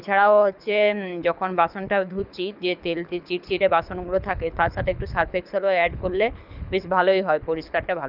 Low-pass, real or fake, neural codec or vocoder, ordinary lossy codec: 5.4 kHz; fake; codec, 24 kHz, 6 kbps, HILCodec; none